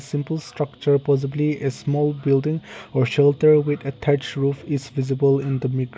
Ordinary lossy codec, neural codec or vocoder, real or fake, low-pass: none; none; real; none